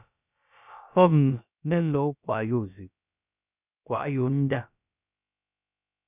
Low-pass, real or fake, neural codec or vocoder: 3.6 kHz; fake; codec, 16 kHz, about 1 kbps, DyCAST, with the encoder's durations